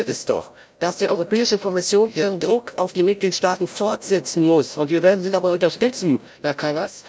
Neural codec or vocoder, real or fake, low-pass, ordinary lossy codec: codec, 16 kHz, 0.5 kbps, FreqCodec, larger model; fake; none; none